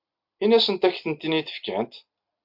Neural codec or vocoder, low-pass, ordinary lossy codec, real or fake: none; 5.4 kHz; MP3, 48 kbps; real